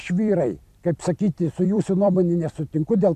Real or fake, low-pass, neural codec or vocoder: fake; 14.4 kHz; vocoder, 44.1 kHz, 128 mel bands every 256 samples, BigVGAN v2